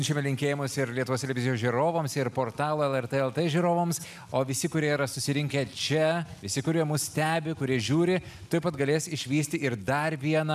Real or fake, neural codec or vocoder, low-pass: real; none; 14.4 kHz